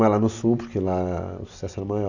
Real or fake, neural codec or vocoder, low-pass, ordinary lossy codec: real; none; 7.2 kHz; none